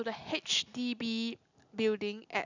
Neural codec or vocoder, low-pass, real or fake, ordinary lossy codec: none; 7.2 kHz; real; none